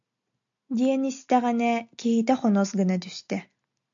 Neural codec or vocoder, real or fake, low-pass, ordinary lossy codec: none; real; 7.2 kHz; AAC, 64 kbps